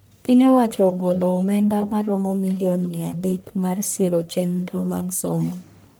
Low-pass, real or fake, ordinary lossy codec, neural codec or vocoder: none; fake; none; codec, 44.1 kHz, 1.7 kbps, Pupu-Codec